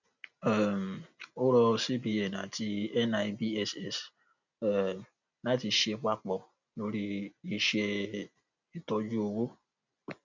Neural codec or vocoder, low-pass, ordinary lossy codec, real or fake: none; 7.2 kHz; none; real